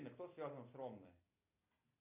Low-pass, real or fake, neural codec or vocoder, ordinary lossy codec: 3.6 kHz; real; none; MP3, 32 kbps